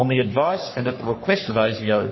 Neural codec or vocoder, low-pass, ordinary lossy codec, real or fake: codec, 44.1 kHz, 3.4 kbps, Pupu-Codec; 7.2 kHz; MP3, 24 kbps; fake